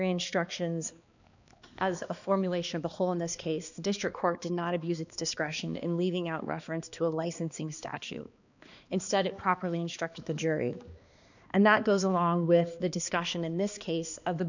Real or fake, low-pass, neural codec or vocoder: fake; 7.2 kHz; codec, 16 kHz, 2 kbps, X-Codec, HuBERT features, trained on balanced general audio